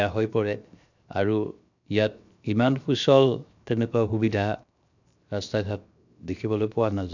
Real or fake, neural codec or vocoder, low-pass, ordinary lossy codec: fake; codec, 16 kHz, 0.7 kbps, FocalCodec; 7.2 kHz; none